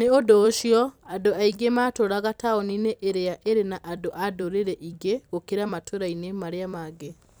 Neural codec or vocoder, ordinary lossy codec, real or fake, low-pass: vocoder, 44.1 kHz, 128 mel bands every 256 samples, BigVGAN v2; none; fake; none